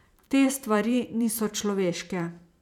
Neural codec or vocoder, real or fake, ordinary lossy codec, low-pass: vocoder, 44.1 kHz, 128 mel bands every 512 samples, BigVGAN v2; fake; none; 19.8 kHz